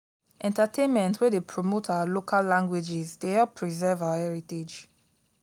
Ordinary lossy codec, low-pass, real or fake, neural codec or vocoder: none; 19.8 kHz; real; none